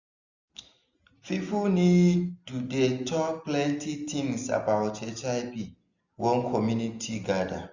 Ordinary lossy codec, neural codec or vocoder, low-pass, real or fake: none; none; 7.2 kHz; real